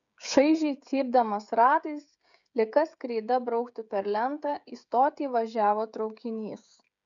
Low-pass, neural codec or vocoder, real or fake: 7.2 kHz; codec, 16 kHz, 16 kbps, FreqCodec, smaller model; fake